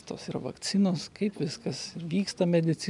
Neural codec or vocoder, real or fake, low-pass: none; real; 10.8 kHz